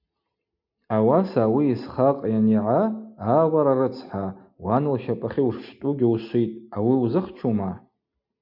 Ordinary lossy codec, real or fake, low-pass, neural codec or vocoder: MP3, 48 kbps; real; 5.4 kHz; none